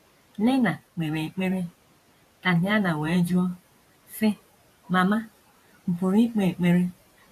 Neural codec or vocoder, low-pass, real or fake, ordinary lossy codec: vocoder, 44.1 kHz, 128 mel bands every 512 samples, BigVGAN v2; 14.4 kHz; fake; AAC, 64 kbps